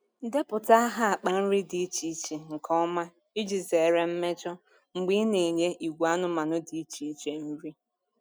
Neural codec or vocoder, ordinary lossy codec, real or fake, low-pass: none; none; real; none